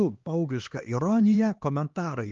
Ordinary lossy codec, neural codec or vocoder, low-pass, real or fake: Opus, 32 kbps; codec, 16 kHz, 2 kbps, X-Codec, HuBERT features, trained on LibriSpeech; 7.2 kHz; fake